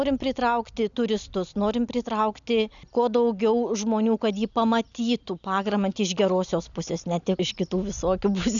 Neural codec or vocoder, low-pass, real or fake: none; 7.2 kHz; real